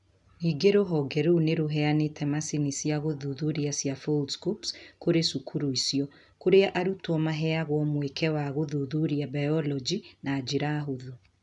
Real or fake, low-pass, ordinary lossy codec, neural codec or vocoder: real; 10.8 kHz; none; none